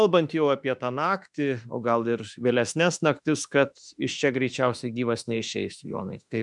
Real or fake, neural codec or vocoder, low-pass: fake; autoencoder, 48 kHz, 32 numbers a frame, DAC-VAE, trained on Japanese speech; 10.8 kHz